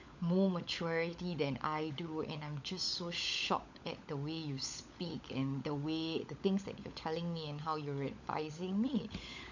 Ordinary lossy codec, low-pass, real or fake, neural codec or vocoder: none; 7.2 kHz; fake; codec, 16 kHz, 16 kbps, FunCodec, trained on LibriTTS, 50 frames a second